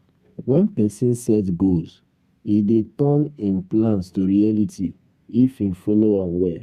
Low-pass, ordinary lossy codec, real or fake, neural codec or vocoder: 14.4 kHz; Opus, 64 kbps; fake; codec, 32 kHz, 1.9 kbps, SNAC